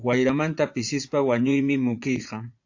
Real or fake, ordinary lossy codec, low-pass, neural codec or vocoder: fake; AAC, 48 kbps; 7.2 kHz; vocoder, 44.1 kHz, 80 mel bands, Vocos